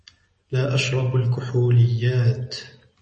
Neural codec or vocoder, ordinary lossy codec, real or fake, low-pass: none; MP3, 32 kbps; real; 9.9 kHz